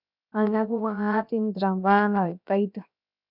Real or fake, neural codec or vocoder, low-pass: fake; codec, 16 kHz, 0.7 kbps, FocalCodec; 5.4 kHz